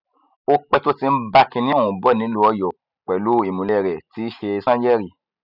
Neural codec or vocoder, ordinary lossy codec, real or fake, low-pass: none; none; real; 5.4 kHz